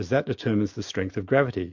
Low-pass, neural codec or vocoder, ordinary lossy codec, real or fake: 7.2 kHz; none; MP3, 48 kbps; real